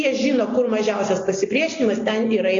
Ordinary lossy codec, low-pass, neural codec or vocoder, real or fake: AAC, 32 kbps; 7.2 kHz; none; real